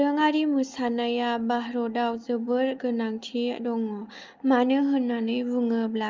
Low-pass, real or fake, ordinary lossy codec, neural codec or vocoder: 7.2 kHz; real; Opus, 32 kbps; none